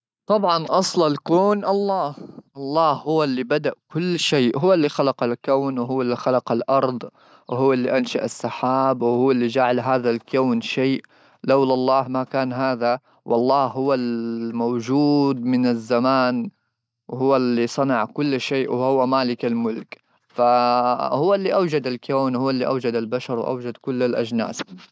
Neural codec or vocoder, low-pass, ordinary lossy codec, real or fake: none; none; none; real